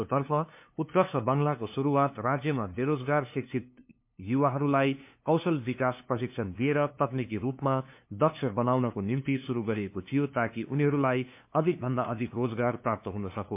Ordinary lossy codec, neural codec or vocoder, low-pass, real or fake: MP3, 32 kbps; codec, 16 kHz, 2 kbps, FunCodec, trained on LibriTTS, 25 frames a second; 3.6 kHz; fake